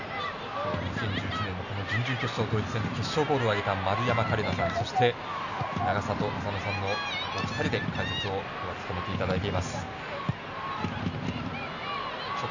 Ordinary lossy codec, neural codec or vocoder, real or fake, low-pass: none; none; real; 7.2 kHz